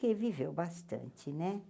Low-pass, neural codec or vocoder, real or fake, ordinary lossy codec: none; none; real; none